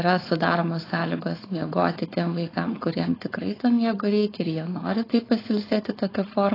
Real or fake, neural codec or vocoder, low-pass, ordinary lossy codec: fake; codec, 16 kHz, 4.8 kbps, FACodec; 5.4 kHz; AAC, 24 kbps